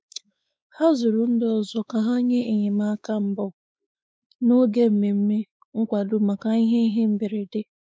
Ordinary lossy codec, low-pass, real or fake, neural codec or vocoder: none; none; fake; codec, 16 kHz, 4 kbps, X-Codec, WavLM features, trained on Multilingual LibriSpeech